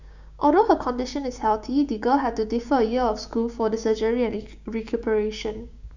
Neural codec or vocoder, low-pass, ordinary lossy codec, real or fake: codec, 16 kHz, 6 kbps, DAC; 7.2 kHz; none; fake